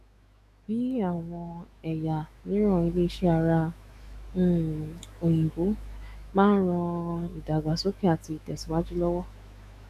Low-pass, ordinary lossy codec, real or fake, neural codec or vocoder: 14.4 kHz; none; fake; autoencoder, 48 kHz, 128 numbers a frame, DAC-VAE, trained on Japanese speech